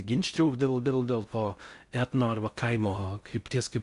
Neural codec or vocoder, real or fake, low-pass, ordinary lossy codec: codec, 16 kHz in and 24 kHz out, 0.6 kbps, FocalCodec, streaming, 4096 codes; fake; 10.8 kHz; Opus, 64 kbps